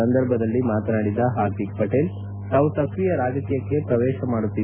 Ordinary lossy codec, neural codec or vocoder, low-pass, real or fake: none; none; 3.6 kHz; real